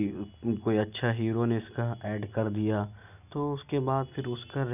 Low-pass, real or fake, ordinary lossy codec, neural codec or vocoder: 3.6 kHz; real; none; none